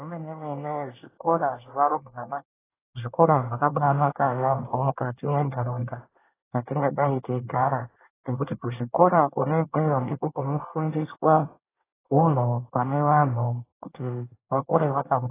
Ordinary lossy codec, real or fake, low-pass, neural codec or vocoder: AAC, 16 kbps; fake; 3.6 kHz; codec, 24 kHz, 1 kbps, SNAC